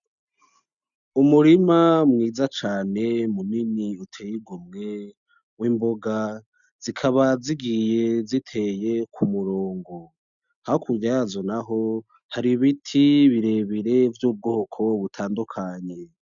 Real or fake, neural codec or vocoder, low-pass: real; none; 7.2 kHz